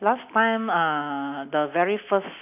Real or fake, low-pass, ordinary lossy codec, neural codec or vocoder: real; 3.6 kHz; none; none